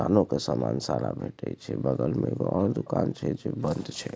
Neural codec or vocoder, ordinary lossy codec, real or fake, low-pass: none; none; real; none